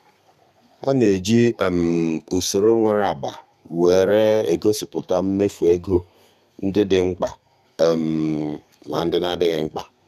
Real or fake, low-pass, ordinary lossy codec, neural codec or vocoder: fake; 14.4 kHz; none; codec, 32 kHz, 1.9 kbps, SNAC